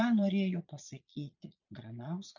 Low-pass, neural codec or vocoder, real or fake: 7.2 kHz; vocoder, 22.05 kHz, 80 mel bands, Vocos; fake